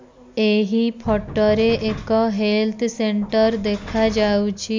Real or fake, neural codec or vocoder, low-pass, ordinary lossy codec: real; none; 7.2 kHz; MP3, 64 kbps